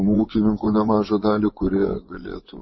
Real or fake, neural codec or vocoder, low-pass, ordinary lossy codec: fake; vocoder, 24 kHz, 100 mel bands, Vocos; 7.2 kHz; MP3, 24 kbps